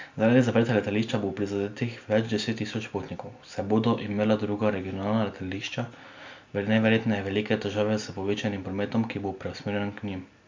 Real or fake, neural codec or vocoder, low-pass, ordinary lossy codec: real; none; 7.2 kHz; MP3, 64 kbps